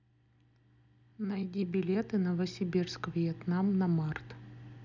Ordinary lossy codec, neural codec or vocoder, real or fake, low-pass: none; none; real; 7.2 kHz